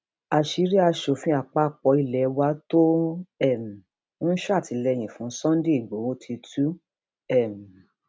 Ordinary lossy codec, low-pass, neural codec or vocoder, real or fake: none; none; none; real